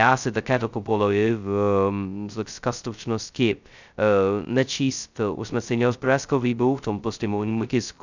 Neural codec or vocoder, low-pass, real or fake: codec, 16 kHz, 0.2 kbps, FocalCodec; 7.2 kHz; fake